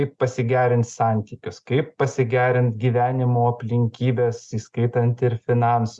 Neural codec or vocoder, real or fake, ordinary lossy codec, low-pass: none; real; Opus, 64 kbps; 10.8 kHz